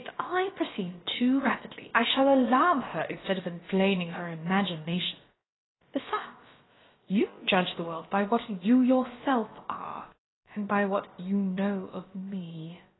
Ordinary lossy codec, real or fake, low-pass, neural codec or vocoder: AAC, 16 kbps; fake; 7.2 kHz; codec, 16 kHz, about 1 kbps, DyCAST, with the encoder's durations